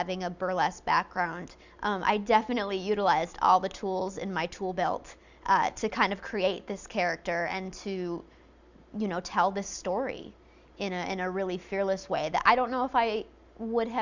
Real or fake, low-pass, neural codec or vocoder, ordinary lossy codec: real; 7.2 kHz; none; Opus, 64 kbps